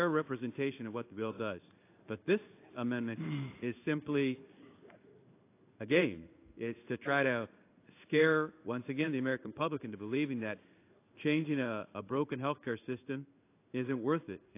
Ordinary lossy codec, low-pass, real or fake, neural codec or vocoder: AAC, 24 kbps; 3.6 kHz; fake; codec, 16 kHz in and 24 kHz out, 1 kbps, XY-Tokenizer